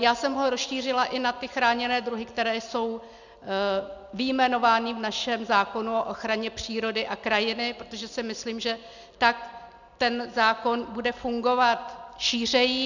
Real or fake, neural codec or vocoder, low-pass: real; none; 7.2 kHz